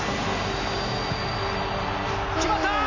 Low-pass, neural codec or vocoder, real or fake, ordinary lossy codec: 7.2 kHz; none; real; none